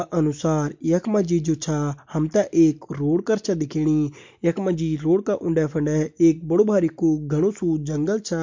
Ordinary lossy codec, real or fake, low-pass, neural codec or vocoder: MP3, 48 kbps; real; 7.2 kHz; none